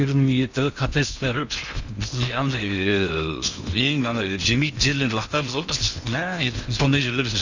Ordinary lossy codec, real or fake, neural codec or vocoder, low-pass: Opus, 64 kbps; fake; codec, 16 kHz in and 24 kHz out, 0.8 kbps, FocalCodec, streaming, 65536 codes; 7.2 kHz